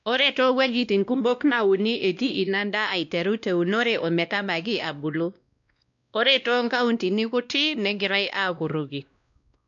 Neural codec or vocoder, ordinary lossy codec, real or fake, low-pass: codec, 16 kHz, 1 kbps, X-Codec, WavLM features, trained on Multilingual LibriSpeech; none; fake; 7.2 kHz